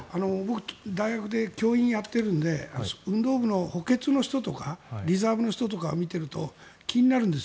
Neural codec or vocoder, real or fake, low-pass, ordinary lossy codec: none; real; none; none